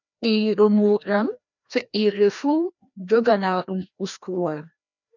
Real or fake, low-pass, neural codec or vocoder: fake; 7.2 kHz; codec, 16 kHz, 1 kbps, FreqCodec, larger model